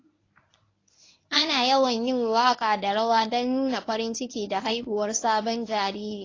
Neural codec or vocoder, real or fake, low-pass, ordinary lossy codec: codec, 24 kHz, 0.9 kbps, WavTokenizer, medium speech release version 1; fake; 7.2 kHz; AAC, 32 kbps